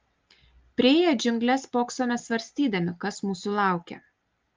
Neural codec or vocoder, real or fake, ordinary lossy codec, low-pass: none; real; Opus, 24 kbps; 7.2 kHz